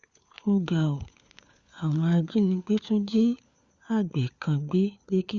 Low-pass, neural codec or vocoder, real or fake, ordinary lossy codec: 7.2 kHz; codec, 16 kHz, 4 kbps, FunCodec, trained on LibriTTS, 50 frames a second; fake; Opus, 64 kbps